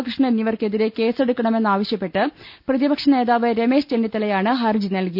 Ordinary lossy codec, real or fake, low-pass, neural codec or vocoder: none; real; 5.4 kHz; none